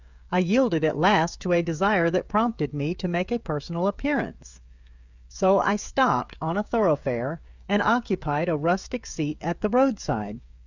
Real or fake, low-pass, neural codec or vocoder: fake; 7.2 kHz; codec, 16 kHz, 16 kbps, FreqCodec, smaller model